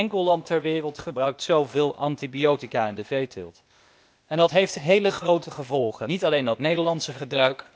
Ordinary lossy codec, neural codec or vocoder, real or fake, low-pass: none; codec, 16 kHz, 0.8 kbps, ZipCodec; fake; none